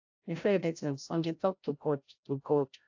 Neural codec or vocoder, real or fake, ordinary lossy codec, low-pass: codec, 16 kHz, 0.5 kbps, FreqCodec, larger model; fake; AAC, 48 kbps; 7.2 kHz